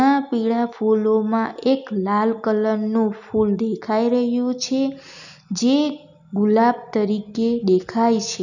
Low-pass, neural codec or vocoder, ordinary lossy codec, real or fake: 7.2 kHz; none; none; real